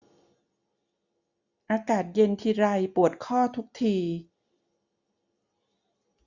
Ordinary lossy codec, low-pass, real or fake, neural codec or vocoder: Opus, 64 kbps; 7.2 kHz; real; none